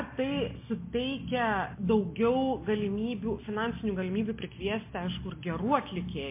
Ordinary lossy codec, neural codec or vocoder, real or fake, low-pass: MP3, 24 kbps; none; real; 3.6 kHz